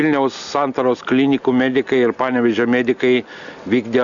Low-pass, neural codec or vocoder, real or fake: 7.2 kHz; none; real